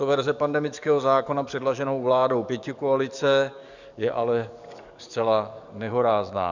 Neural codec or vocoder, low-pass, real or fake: codec, 44.1 kHz, 7.8 kbps, DAC; 7.2 kHz; fake